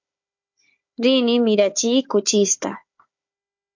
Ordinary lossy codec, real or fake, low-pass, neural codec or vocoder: MP3, 48 kbps; fake; 7.2 kHz; codec, 16 kHz, 4 kbps, FunCodec, trained on Chinese and English, 50 frames a second